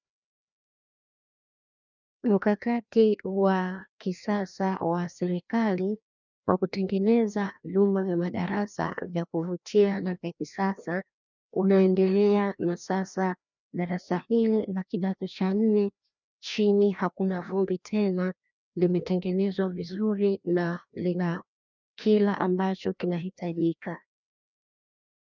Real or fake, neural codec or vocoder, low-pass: fake; codec, 16 kHz, 1 kbps, FreqCodec, larger model; 7.2 kHz